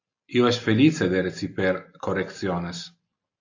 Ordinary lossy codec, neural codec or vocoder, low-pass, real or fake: AAC, 48 kbps; none; 7.2 kHz; real